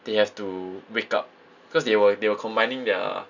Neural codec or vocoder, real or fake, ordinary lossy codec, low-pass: none; real; none; 7.2 kHz